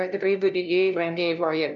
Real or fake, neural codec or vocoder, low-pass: fake; codec, 16 kHz, 0.5 kbps, FunCodec, trained on LibriTTS, 25 frames a second; 7.2 kHz